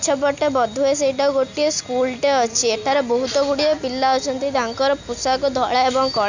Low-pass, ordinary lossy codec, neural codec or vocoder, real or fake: 7.2 kHz; Opus, 64 kbps; none; real